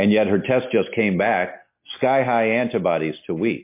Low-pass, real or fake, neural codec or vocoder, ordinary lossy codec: 3.6 kHz; real; none; MP3, 24 kbps